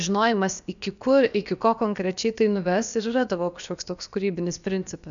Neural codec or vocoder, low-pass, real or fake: codec, 16 kHz, about 1 kbps, DyCAST, with the encoder's durations; 7.2 kHz; fake